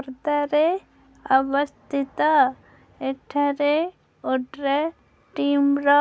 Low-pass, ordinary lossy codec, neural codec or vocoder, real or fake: none; none; none; real